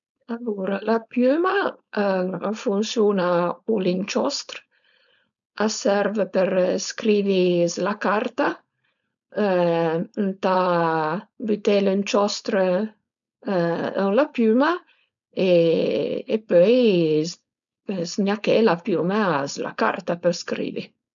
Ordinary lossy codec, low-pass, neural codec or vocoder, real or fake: none; 7.2 kHz; codec, 16 kHz, 4.8 kbps, FACodec; fake